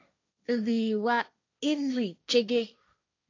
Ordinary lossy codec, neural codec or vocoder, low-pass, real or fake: AAC, 48 kbps; codec, 16 kHz, 1.1 kbps, Voila-Tokenizer; 7.2 kHz; fake